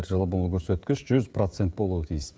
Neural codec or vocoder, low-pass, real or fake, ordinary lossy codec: codec, 16 kHz, 16 kbps, FreqCodec, smaller model; none; fake; none